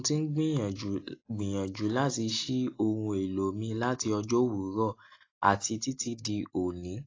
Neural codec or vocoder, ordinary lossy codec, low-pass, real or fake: none; AAC, 32 kbps; 7.2 kHz; real